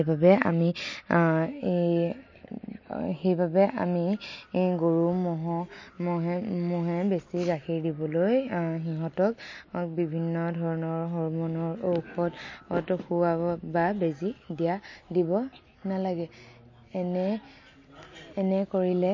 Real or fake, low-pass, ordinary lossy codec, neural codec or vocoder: real; 7.2 kHz; MP3, 32 kbps; none